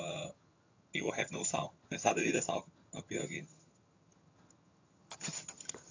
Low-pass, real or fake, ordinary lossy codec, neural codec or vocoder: 7.2 kHz; fake; AAC, 48 kbps; vocoder, 22.05 kHz, 80 mel bands, HiFi-GAN